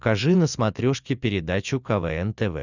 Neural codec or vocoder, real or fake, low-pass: none; real; 7.2 kHz